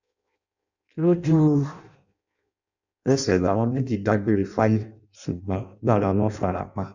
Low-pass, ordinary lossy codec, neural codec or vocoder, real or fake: 7.2 kHz; none; codec, 16 kHz in and 24 kHz out, 0.6 kbps, FireRedTTS-2 codec; fake